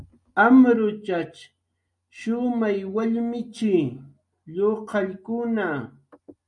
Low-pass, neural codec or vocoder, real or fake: 10.8 kHz; none; real